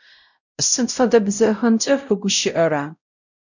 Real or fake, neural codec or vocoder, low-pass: fake; codec, 16 kHz, 0.5 kbps, X-Codec, WavLM features, trained on Multilingual LibriSpeech; 7.2 kHz